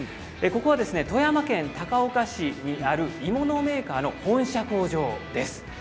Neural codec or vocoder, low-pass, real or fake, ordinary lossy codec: none; none; real; none